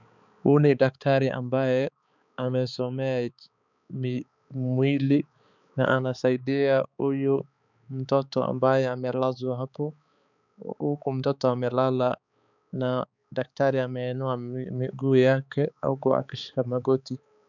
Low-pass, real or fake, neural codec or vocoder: 7.2 kHz; fake; codec, 16 kHz, 4 kbps, X-Codec, HuBERT features, trained on balanced general audio